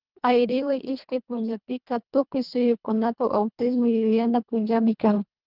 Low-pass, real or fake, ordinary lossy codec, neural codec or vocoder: 5.4 kHz; fake; Opus, 24 kbps; codec, 24 kHz, 1.5 kbps, HILCodec